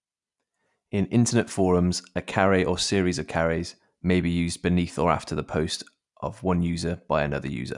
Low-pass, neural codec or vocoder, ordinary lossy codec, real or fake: 10.8 kHz; none; none; real